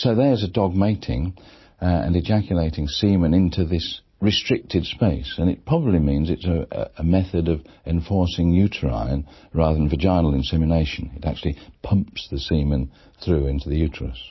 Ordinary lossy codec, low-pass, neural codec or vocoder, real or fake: MP3, 24 kbps; 7.2 kHz; none; real